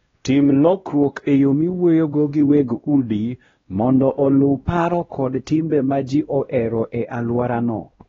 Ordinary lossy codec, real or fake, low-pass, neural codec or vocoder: AAC, 24 kbps; fake; 7.2 kHz; codec, 16 kHz, 1 kbps, X-Codec, WavLM features, trained on Multilingual LibriSpeech